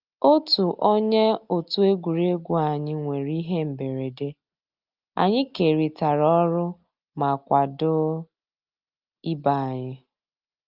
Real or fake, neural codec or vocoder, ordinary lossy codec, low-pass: real; none; Opus, 32 kbps; 5.4 kHz